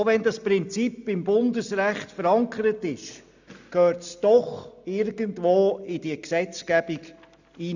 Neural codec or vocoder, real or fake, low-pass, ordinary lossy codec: none; real; 7.2 kHz; none